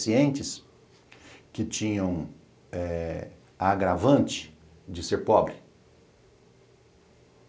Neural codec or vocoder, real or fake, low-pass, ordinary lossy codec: none; real; none; none